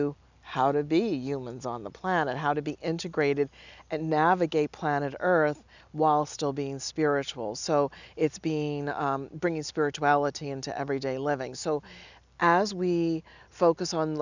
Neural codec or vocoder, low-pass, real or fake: none; 7.2 kHz; real